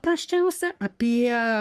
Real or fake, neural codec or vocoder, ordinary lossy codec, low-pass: fake; codec, 44.1 kHz, 3.4 kbps, Pupu-Codec; Opus, 64 kbps; 14.4 kHz